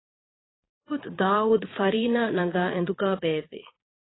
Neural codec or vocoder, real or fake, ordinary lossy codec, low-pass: vocoder, 22.05 kHz, 80 mel bands, Vocos; fake; AAC, 16 kbps; 7.2 kHz